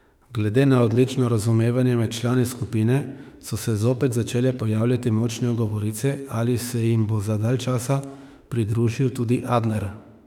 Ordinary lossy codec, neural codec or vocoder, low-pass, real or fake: none; autoencoder, 48 kHz, 32 numbers a frame, DAC-VAE, trained on Japanese speech; 19.8 kHz; fake